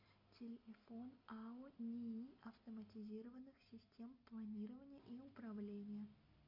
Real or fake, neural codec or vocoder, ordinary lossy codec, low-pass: real; none; MP3, 32 kbps; 5.4 kHz